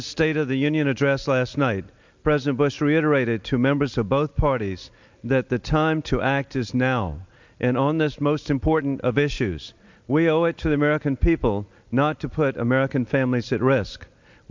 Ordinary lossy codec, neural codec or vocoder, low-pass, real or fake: MP3, 64 kbps; none; 7.2 kHz; real